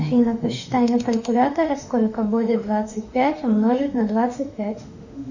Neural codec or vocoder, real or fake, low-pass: autoencoder, 48 kHz, 32 numbers a frame, DAC-VAE, trained on Japanese speech; fake; 7.2 kHz